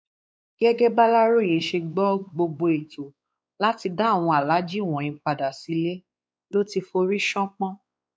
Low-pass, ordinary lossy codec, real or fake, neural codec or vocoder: none; none; fake; codec, 16 kHz, 4 kbps, X-Codec, WavLM features, trained on Multilingual LibriSpeech